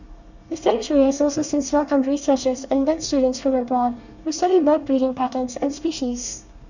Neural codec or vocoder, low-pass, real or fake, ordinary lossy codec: codec, 24 kHz, 1 kbps, SNAC; 7.2 kHz; fake; none